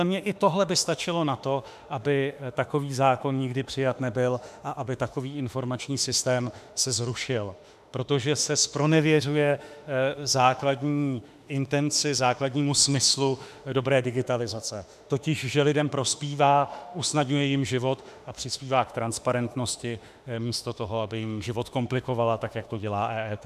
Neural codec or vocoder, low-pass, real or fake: autoencoder, 48 kHz, 32 numbers a frame, DAC-VAE, trained on Japanese speech; 14.4 kHz; fake